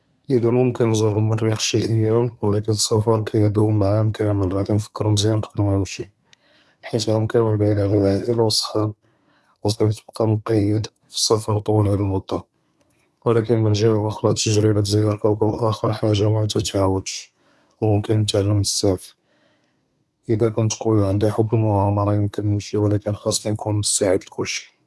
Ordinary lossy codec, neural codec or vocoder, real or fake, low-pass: none; codec, 24 kHz, 1 kbps, SNAC; fake; none